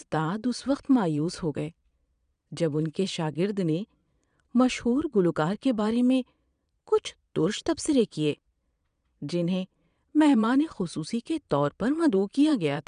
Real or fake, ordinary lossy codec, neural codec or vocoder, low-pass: fake; none; vocoder, 22.05 kHz, 80 mel bands, Vocos; 9.9 kHz